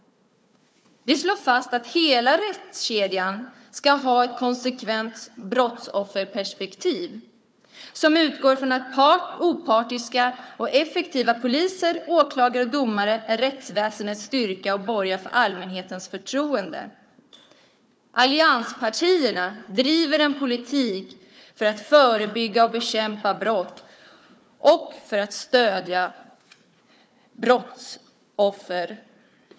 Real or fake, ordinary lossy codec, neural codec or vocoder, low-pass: fake; none; codec, 16 kHz, 4 kbps, FunCodec, trained on Chinese and English, 50 frames a second; none